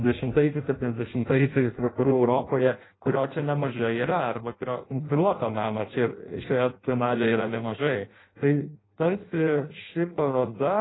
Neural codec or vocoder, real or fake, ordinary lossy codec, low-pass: codec, 16 kHz in and 24 kHz out, 0.6 kbps, FireRedTTS-2 codec; fake; AAC, 16 kbps; 7.2 kHz